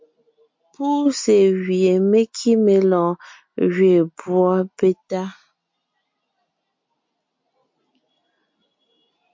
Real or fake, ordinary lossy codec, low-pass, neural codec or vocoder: real; MP3, 48 kbps; 7.2 kHz; none